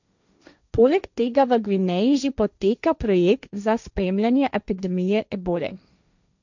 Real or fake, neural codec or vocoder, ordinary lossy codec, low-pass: fake; codec, 16 kHz, 1.1 kbps, Voila-Tokenizer; none; 7.2 kHz